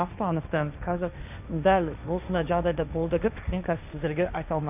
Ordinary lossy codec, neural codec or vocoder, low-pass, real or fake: none; codec, 16 kHz, 1.1 kbps, Voila-Tokenizer; 3.6 kHz; fake